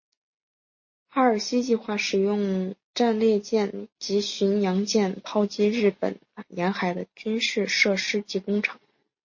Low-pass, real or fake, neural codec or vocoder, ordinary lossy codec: 7.2 kHz; real; none; MP3, 32 kbps